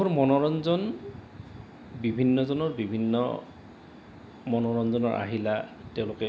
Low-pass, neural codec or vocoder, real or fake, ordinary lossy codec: none; none; real; none